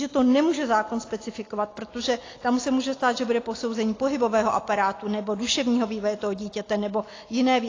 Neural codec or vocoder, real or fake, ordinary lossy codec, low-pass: none; real; AAC, 32 kbps; 7.2 kHz